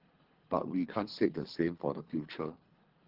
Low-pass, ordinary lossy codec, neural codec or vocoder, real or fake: 5.4 kHz; Opus, 16 kbps; codec, 24 kHz, 3 kbps, HILCodec; fake